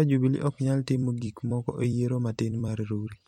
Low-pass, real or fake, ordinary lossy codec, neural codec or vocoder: 19.8 kHz; fake; MP3, 64 kbps; vocoder, 44.1 kHz, 128 mel bands every 256 samples, BigVGAN v2